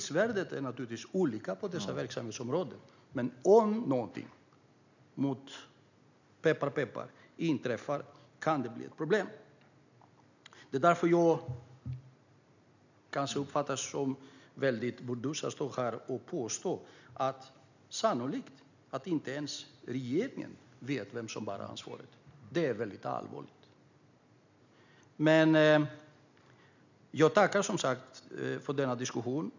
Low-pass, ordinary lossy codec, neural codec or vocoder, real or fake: 7.2 kHz; none; none; real